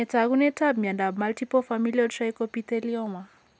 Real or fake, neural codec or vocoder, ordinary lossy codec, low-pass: real; none; none; none